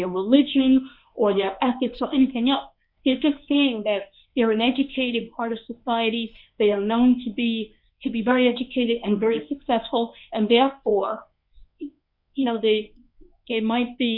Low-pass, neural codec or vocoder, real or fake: 5.4 kHz; codec, 24 kHz, 0.9 kbps, WavTokenizer, medium speech release version 1; fake